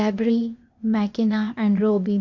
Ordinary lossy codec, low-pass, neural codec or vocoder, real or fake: none; 7.2 kHz; codec, 16 kHz, 0.8 kbps, ZipCodec; fake